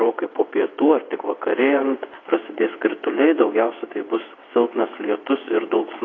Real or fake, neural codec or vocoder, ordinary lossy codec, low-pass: fake; vocoder, 22.05 kHz, 80 mel bands, WaveNeXt; AAC, 32 kbps; 7.2 kHz